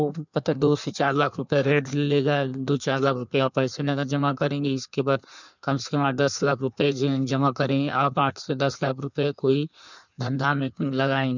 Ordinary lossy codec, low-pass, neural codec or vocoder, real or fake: none; 7.2 kHz; codec, 16 kHz in and 24 kHz out, 1.1 kbps, FireRedTTS-2 codec; fake